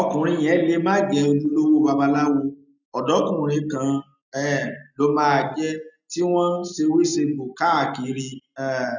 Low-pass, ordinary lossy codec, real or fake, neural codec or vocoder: 7.2 kHz; none; real; none